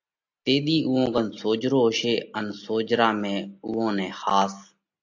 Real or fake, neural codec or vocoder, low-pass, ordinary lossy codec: real; none; 7.2 kHz; AAC, 48 kbps